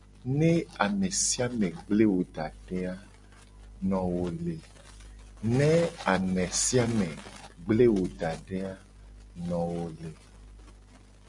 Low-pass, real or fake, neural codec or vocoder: 10.8 kHz; real; none